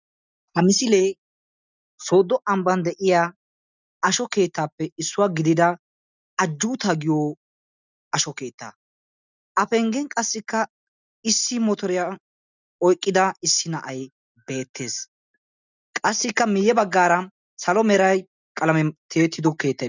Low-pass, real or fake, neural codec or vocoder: 7.2 kHz; real; none